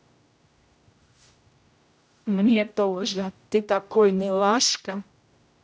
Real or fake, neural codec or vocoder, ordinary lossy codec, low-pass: fake; codec, 16 kHz, 0.5 kbps, X-Codec, HuBERT features, trained on general audio; none; none